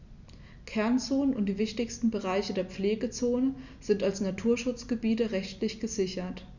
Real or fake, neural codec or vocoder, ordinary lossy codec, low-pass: real; none; none; 7.2 kHz